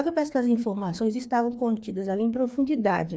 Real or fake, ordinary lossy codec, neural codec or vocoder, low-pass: fake; none; codec, 16 kHz, 2 kbps, FreqCodec, larger model; none